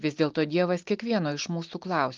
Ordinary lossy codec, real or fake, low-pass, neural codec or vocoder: Opus, 32 kbps; real; 7.2 kHz; none